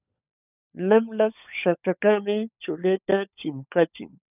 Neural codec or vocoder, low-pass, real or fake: codec, 16 kHz, 16 kbps, FunCodec, trained on LibriTTS, 50 frames a second; 3.6 kHz; fake